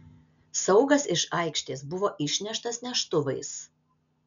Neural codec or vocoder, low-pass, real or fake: none; 7.2 kHz; real